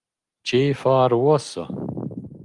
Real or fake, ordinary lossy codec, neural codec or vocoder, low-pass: real; Opus, 32 kbps; none; 10.8 kHz